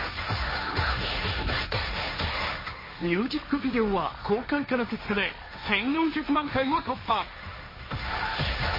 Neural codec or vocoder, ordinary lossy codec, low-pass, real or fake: codec, 16 kHz, 1.1 kbps, Voila-Tokenizer; MP3, 24 kbps; 5.4 kHz; fake